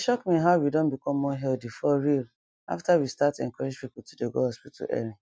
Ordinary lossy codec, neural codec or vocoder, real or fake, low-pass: none; none; real; none